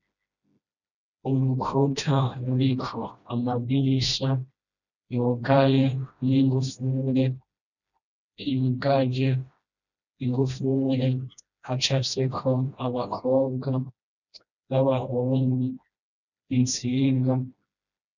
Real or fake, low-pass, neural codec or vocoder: fake; 7.2 kHz; codec, 16 kHz, 1 kbps, FreqCodec, smaller model